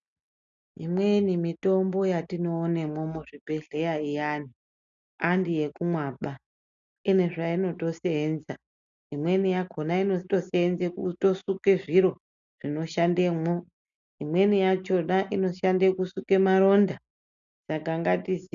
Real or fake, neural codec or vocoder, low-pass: real; none; 7.2 kHz